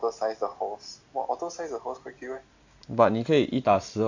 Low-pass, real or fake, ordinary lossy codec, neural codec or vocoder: 7.2 kHz; real; AAC, 48 kbps; none